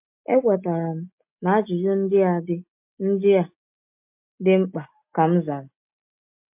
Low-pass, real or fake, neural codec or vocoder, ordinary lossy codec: 3.6 kHz; real; none; MP3, 32 kbps